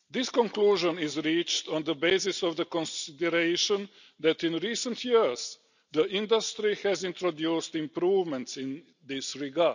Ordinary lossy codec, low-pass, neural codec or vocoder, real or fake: none; 7.2 kHz; none; real